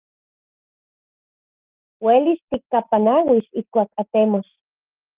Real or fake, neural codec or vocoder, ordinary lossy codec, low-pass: real; none; Opus, 24 kbps; 3.6 kHz